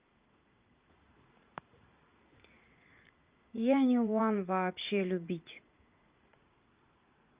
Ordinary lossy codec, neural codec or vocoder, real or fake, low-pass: Opus, 24 kbps; vocoder, 44.1 kHz, 80 mel bands, Vocos; fake; 3.6 kHz